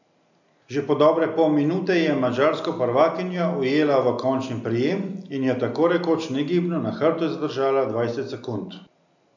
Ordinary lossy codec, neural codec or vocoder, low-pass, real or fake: none; none; 7.2 kHz; real